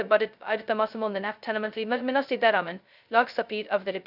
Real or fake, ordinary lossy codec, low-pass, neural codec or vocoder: fake; none; 5.4 kHz; codec, 16 kHz, 0.2 kbps, FocalCodec